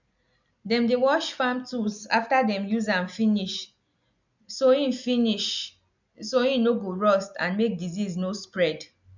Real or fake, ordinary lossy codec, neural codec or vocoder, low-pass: real; none; none; 7.2 kHz